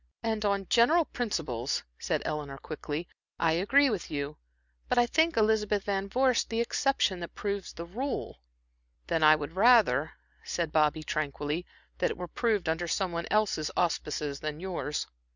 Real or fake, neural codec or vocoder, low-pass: real; none; 7.2 kHz